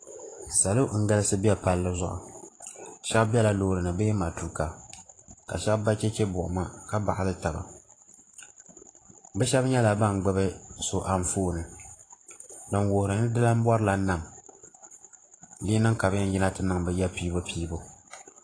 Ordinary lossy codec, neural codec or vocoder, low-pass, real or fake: AAC, 32 kbps; vocoder, 44.1 kHz, 128 mel bands every 512 samples, BigVGAN v2; 9.9 kHz; fake